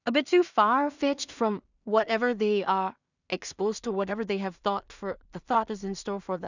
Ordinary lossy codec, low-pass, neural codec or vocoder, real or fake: none; 7.2 kHz; codec, 16 kHz in and 24 kHz out, 0.4 kbps, LongCat-Audio-Codec, two codebook decoder; fake